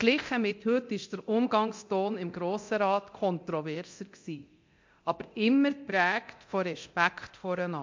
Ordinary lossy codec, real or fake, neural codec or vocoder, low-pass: MP3, 48 kbps; fake; codec, 24 kHz, 0.9 kbps, DualCodec; 7.2 kHz